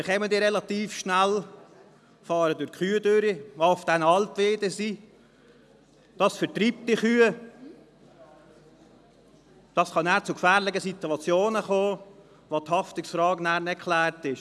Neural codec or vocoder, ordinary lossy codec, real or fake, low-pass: none; none; real; none